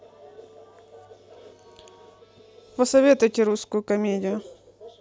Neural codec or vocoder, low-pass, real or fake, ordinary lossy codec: none; none; real; none